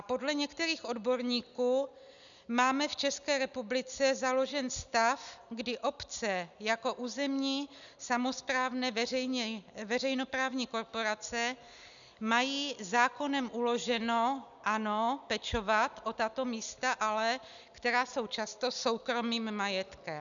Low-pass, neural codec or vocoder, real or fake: 7.2 kHz; none; real